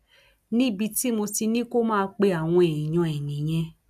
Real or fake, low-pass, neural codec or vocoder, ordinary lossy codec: real; 14.4 kHz; none; none